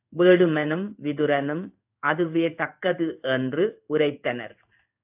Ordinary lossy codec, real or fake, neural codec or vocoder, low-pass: AAC, 32 kbps; fake; codec, 16 kHz in and 24 kHz out, 1 kbps, XY-Tokenizer; 3.6 kHz